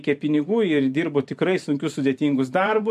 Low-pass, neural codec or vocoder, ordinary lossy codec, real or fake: 14.4 kHz; vocoder, 44.1 kHz, 128 mel bands every 512 samples, BigVGAN v2; MP3, 64 kbps; fake